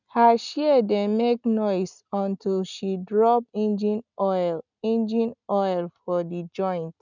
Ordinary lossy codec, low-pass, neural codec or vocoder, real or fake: none; 7.2 kHz; none; real